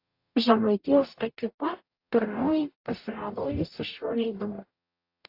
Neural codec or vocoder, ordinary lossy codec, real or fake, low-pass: codec, 44.1 kHz, 0.9 kbps, DAC; AAC, 32 kbps; fake; 5.4 kHz